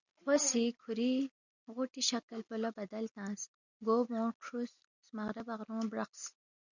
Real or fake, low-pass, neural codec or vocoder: real; 7.2 kHz; none